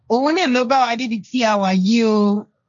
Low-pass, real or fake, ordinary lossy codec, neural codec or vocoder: 7.2 kHz; fake; none; codec, 16 kHz, 1.1 kbps, Voila-Tokenizer